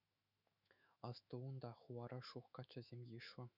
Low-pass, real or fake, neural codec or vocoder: 5.4 kHz; fake; autoencoder, 48 kHz, 128 numbers a frame, DAC-VAE, trained on Japanese speech